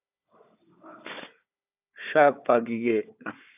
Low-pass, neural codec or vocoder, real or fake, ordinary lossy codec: 3.6 kHz; codec, 16 kHz, 4 kbps, FunCodec, trained on Chinese and English, 50 frames a second; fake; AAC, 32 kbps